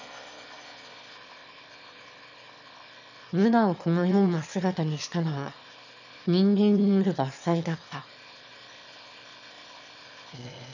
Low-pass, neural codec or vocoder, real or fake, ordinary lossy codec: 7.2 kHz; autoencoder, 22.05 kHz, a latent of 192 numbers a frame, VITS, trained on one speaker; fake; none